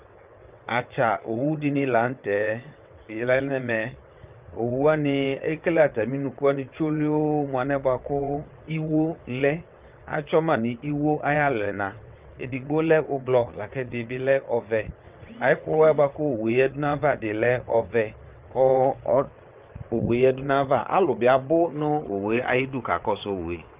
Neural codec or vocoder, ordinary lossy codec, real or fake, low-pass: vocoder, 22.05 kHz, 80 mel bands, WaveNeXt; Opus, 24 kbps; fake; 3.6 kHz